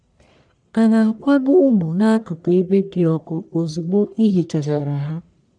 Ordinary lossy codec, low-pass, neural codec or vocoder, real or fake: none; 9.9 kHz; codec, 44.1 kHz, 1.7 kbps, Pupu-Codec; fake